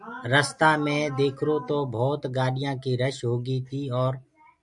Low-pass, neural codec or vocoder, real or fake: 10.8 kHz; none; real